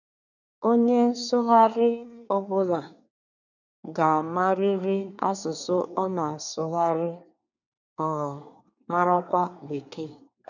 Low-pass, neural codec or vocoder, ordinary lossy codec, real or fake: 7.2 kHz; codec, 24 kHz, 1 kbps, SNAC; none; fake